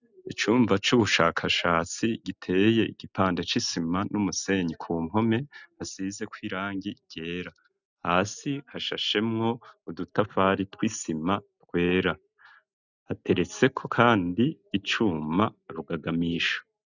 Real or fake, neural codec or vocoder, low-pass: real; none; 7.2 kHz